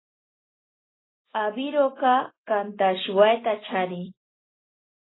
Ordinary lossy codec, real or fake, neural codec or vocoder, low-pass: AAC, 16 kbps; real; none; 7.2 kHz